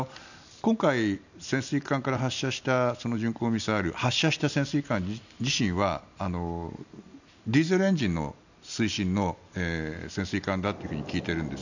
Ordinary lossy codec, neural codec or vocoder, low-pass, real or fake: none; none; 7.2 kHz; real